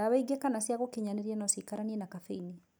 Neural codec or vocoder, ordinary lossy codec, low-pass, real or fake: none; none; none; real